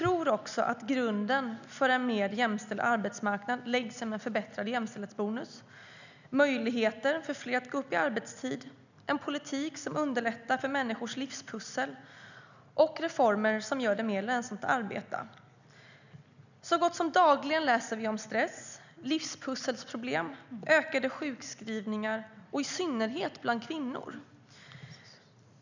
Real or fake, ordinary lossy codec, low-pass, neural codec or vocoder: real; none; 7.2 kHz; none